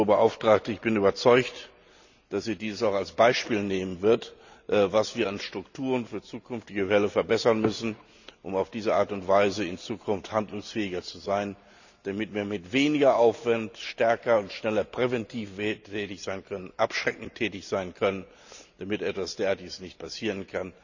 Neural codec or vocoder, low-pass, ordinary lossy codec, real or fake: none; 7.2 kHz; none; real